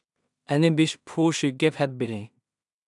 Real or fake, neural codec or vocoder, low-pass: fake; codec, 16 kHz in and 24 kHz out, 0.4 kbps, LongCat-Audio-Codec, two codebook decoder; 10.8 kHz